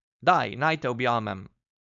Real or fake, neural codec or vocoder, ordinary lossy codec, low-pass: fake; codec, 16 kHz, 4.8 kbps, FACodec; none; 7.2 kHz